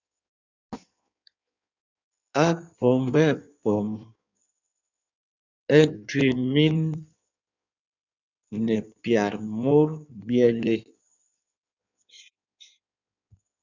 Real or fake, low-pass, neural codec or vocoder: fake; 7.2 kHz; codec, 16 kHz in and 24 kHz out, 1.1 kbps, FireRedTTS-2 codec